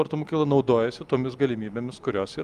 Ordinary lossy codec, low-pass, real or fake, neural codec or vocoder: Opus, 32 kbps; 14.4 kHz; real; none